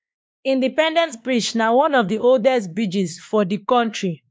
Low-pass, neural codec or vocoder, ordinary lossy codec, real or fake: none; codec, 16 kHz, 2 kbps, X-Codec, WavLM features, trained on Multilingual LibriSpeech; none; fake